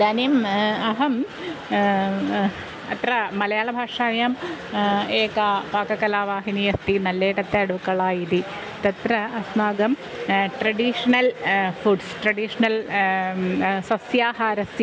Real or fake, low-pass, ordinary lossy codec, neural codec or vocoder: real; none; none; none